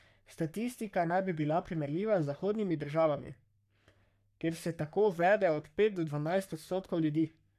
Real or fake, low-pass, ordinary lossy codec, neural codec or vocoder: fake; 14.4 kHz; none; codec, 44.1 kHz, 3.4 kbps, Pupu-Codec